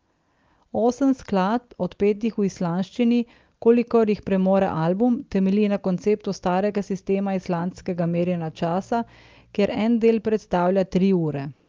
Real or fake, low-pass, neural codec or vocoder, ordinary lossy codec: real; 7.2 kHz; none; Opus, 24 kbps